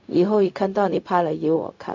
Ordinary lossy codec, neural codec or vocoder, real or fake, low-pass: MP3, 64 kbps; codec, 16 kHz, 0.4 kbps, LongCat-Audio-Codec; fake; 7.2 kHz